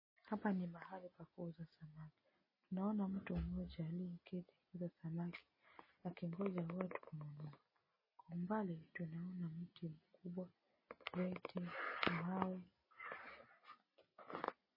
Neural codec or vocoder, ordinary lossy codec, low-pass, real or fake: none; MP3, 24 kbps; 5.4 kHz; real